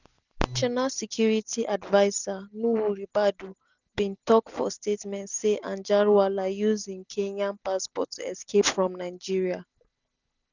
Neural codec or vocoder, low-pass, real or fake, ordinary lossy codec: none; 7.2 kHz; real; none